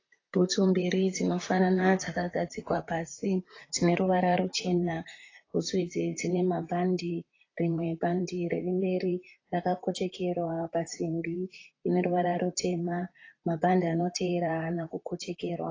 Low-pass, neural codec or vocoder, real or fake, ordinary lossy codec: 7.2 kHz; vocoder, 44.1 kHz, 128 mel bands, Pupu-Vocoder; fake; AAC, 32 kbps